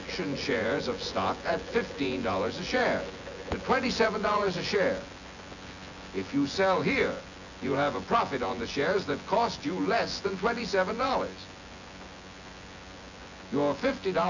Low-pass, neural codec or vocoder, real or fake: 7.2 kHz; vocoder, 24 kHz, 100 mel bands, Vocos; fake